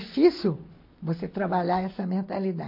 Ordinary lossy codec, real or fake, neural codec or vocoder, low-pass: MP3, 32 kbps; real; none; 5.4 kHz